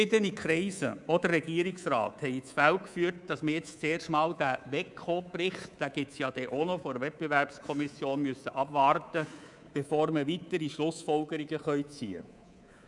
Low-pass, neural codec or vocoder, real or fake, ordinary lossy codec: 10.8 kHz; codec, 24 kHz, 3.1 kbps, DualCodec; fake; none